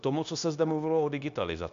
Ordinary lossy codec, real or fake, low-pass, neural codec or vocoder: MP3, 64 kbps; fake; 7.2 kHz; codec, 16 kHz, 0.9 kbps, LongCat-Audio-Codec